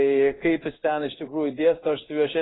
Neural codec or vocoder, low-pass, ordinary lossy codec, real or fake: codec, 16 kHz in and 24 kHz out, 1 kbps, XY-Tokenizer; 7.2 kHz; AAC, 16 kbps; fake